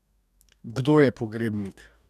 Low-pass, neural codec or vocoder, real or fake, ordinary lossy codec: 14.4 kHz; codec, 44.1 kHz, 2.6 kbps, DAC; fake; none